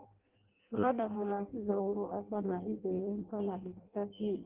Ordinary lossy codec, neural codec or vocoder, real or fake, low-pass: Opus, 16 kbps; codec, 16 kHz in and 24 kHz out, 0.6 kbps, FireRedTTS-2 codec; fake; 3.6 kHz